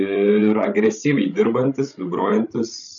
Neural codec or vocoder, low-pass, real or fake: codec, 16 kHz, 16 kbps, FreqCodec, larger model; 7.2 kHz; fake